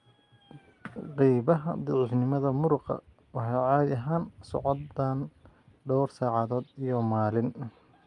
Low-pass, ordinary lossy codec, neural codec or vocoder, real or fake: 10.8 kHz; Opus, 32 kbps; none; real